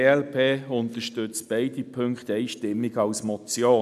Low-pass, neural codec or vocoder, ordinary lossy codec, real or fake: 14.4 kHz; none; none; real